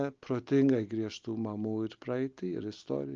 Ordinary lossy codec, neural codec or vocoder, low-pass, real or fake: Opus, 24 kbps; none; 7.2 kHz; real